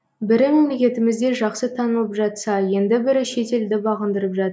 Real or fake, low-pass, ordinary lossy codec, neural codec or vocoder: real; none; none; none